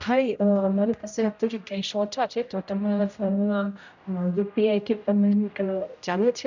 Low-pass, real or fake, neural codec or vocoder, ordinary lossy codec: 7.2 kHz; fake; codec, 16 kHz, 0.5 kbps, X-Codec, HuBERT features, trained on general audio; none